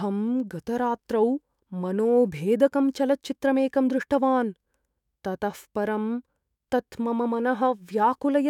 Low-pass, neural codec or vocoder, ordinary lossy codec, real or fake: 19.8 kHz; autoencoder, 48 kHz, 128 numbers a frame, DAC-VAE, trained on Japanese speech; none; fake